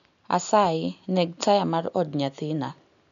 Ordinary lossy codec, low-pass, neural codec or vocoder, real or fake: none; 7.2 kHz; none; real